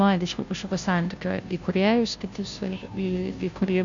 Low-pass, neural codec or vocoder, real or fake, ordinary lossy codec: 7.2 kHz; codec, 16 kHz, 0.5 kbps, FunCodec, trained on Chinese and English, 25 frames a second; fake; MP3, 64 kbps